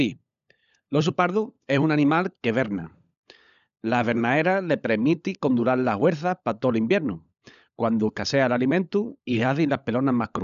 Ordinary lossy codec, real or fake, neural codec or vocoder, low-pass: none; fake; codec, 16 kHz, 16 kbps, FunCodec, trained on LibriTTS, 50 frames a second; 7.2 kHz